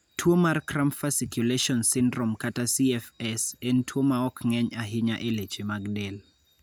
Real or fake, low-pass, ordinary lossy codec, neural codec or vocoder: real; none; none; none